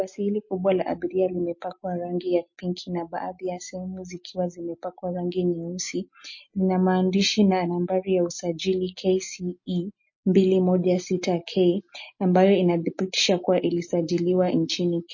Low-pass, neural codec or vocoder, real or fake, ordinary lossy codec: 7.2 kHz; none; real; MP3, 32 kbps